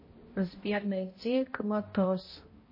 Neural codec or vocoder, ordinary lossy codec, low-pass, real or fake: codec, 16 kHz, 0.5 kbps, X-Codec, HuBERT features, trained on balanced general audio; MP3, 24 kbps; 5.4 kHz; fake